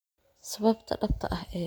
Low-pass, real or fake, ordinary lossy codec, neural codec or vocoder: none; real; none; none